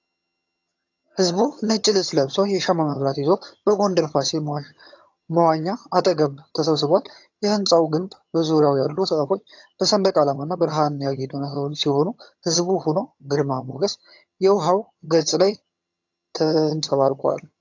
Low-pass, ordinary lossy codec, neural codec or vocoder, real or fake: 7.2 kHz; AAC, 48 kbps; vocoder, 22.05 kHz, 80 mel bands, HiFi-GAN; fake